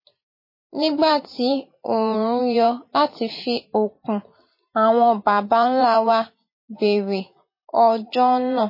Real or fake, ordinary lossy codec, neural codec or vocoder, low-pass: fake; MP3, 24 kbps; vocoder, 44.1 kHz, 128 mel bands every 512 samples, BigVGAN v2; 5.4 kHz